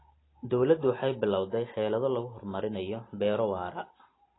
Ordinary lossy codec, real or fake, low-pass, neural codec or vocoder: AAC, 16 kbps; real; 7.2 kHz; none